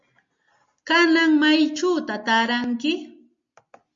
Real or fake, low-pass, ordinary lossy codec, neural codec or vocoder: real; 7.2 kHz; MP3, 48 kbps; none